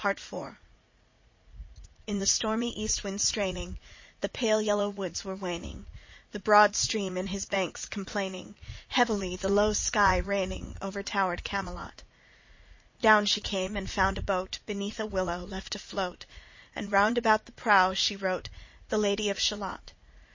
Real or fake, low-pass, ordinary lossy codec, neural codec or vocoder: fake; 7.2 kHz; MP3, 32 kbps; vocoder, 44.1 kHz, 80 mel bands, Vocos